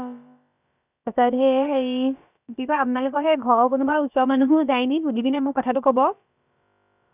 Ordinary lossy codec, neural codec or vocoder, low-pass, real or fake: none; codec, 16 kHz, about 1 kbps, DyCAST, with the encoder's durations; 3.6 kHz; fake